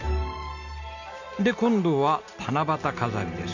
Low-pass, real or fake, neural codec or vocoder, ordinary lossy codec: 7.2 kHz; real; none; none